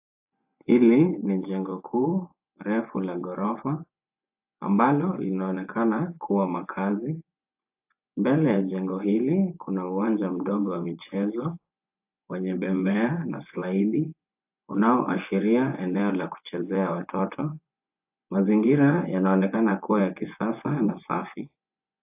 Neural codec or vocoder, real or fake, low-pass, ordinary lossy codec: vocoder, 24 kHz, 100 mel bands, Vocos; fake; 3.6 kHz; AAC, 32 kbps